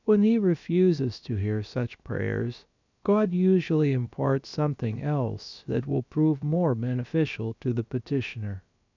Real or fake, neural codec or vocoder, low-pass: fake; codec, 16 kHz, about 1 kbps, DyCAST, with the encoder's durations; 7.2 kHz